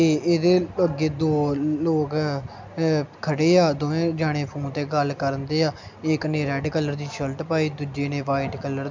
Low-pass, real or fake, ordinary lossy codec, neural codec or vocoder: 7.2 kHz; real; MP3, 64 kbps; none